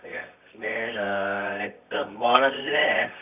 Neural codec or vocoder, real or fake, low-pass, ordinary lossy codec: codec, 24 kHz, 0.9 kbps, WavTokenizer, medium music audio release; fake; 3.6 kHz; none